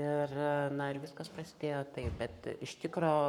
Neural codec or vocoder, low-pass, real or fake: codec, 44.1 kHz, 7.8 kbps, Pupu-Codec; 19.8 kHz; fake